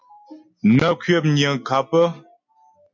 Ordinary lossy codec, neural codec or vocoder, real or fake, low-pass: MP3, 48 kbps; none; real; 7.2 kHz